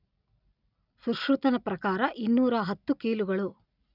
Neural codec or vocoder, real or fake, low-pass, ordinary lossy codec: none; real; 5.4 kHz; none